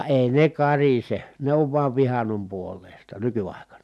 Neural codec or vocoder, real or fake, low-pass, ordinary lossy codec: none; real; none; none